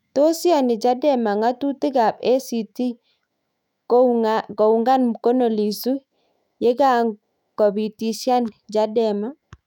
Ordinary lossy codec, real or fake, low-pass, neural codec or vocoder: none; fake; 19.8 kHz; autoencoder, 48 kHz, 128 numbers a frame, DAC-VAE, trained on Japanese speech